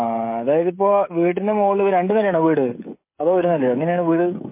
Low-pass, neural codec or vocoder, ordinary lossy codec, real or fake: 3.6 kHz; codec, 16 kHz, 16 kbps, FreqCodec, smaller model; MP3, 24 kbps; fake